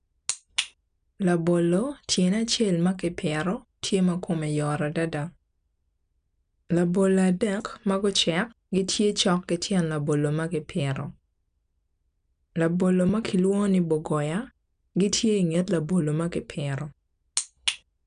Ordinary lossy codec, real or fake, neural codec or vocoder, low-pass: none; real; none; 9.9 kHz